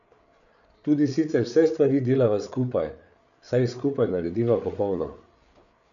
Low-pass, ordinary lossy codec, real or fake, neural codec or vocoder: 7.2 kHz; none; fake; codec, 16 kHz, 8 kbps, FreqCodec, larger model